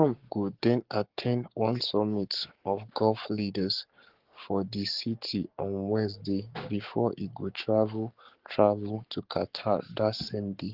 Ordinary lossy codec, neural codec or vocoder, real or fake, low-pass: Opus, 16 kbps; codec, 44.1 kHz, 7.8 kbps, Pupu-Codec; fake; 5.4 kHz